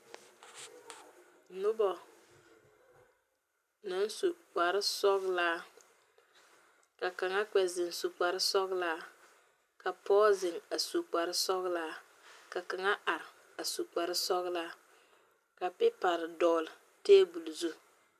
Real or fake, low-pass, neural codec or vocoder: real; 14.4 kHz; none